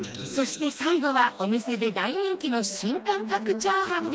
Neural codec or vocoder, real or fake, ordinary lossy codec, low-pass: codec, 16 kHz, 1 kbps, FreqCodec, smaller model; fake; none; none